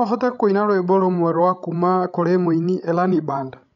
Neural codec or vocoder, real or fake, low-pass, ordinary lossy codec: codec, 16 kHz, 16 kbps, FreqCodec, larger model; fake; 7.2 kHz; none